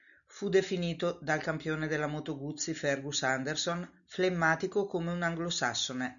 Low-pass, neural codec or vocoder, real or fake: 7.2 kHz; none; real